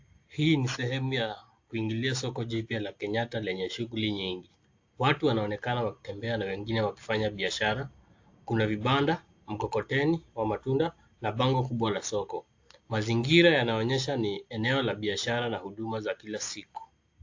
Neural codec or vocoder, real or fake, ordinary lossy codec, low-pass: none; real; AAC, 48 kbps; 7.2 kHz